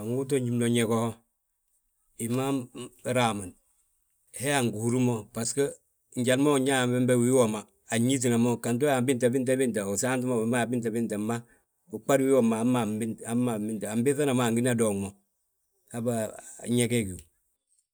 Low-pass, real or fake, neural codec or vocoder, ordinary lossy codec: none; real; none; none